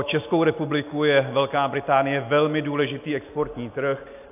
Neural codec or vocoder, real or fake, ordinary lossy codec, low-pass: none; real; AAC, 32 kbps; 3.6 kHz